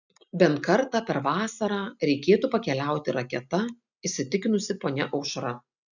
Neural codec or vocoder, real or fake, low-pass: none; real; 7.2 kHz